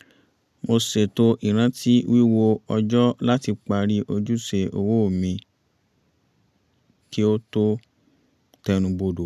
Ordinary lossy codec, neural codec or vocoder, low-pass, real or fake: none; none; 14.4 kHz; real